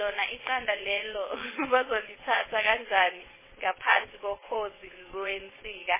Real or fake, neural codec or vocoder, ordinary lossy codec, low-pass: fake; vocoder, 22.05 kHz, 80 mel bands, WaveNeXt; MP3, 16 kbps; 3.6 kHz